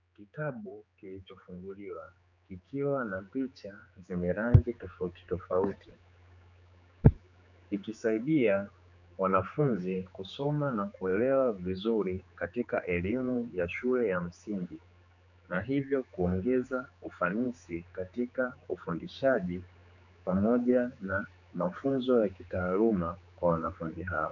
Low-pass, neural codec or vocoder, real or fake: 7.2 kHz; codec, 16 kHz, 4 kbps, X-Codec, HuBERT features, trained on general audio; fake